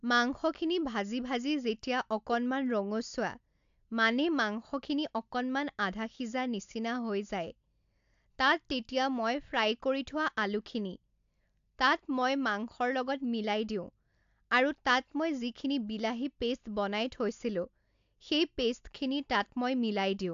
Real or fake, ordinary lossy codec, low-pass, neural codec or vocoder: real; AAC, 64 kbps; 7.2 kHz; none